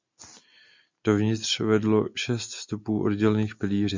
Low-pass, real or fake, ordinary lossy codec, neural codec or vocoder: 7.2 kHz; real; MP3, 64 kbps; none